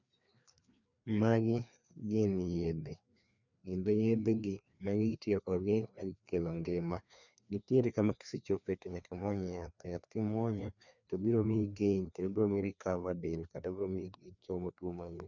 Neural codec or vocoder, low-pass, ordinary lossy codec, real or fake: codec, 16 kHz, 2 kbps, FreqCodec, larger model; 7.2 kHz; none; fake